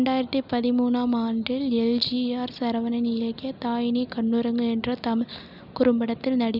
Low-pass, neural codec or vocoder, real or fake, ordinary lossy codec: 5.4 kHz; none; real; none